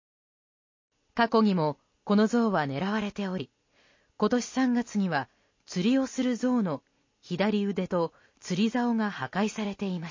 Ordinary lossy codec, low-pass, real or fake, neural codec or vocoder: MP3, 32 kbps; 7.2 kHz; real; none